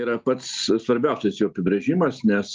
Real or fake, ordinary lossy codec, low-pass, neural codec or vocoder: real; Opus, 24 kbps; 7.2 kHz; none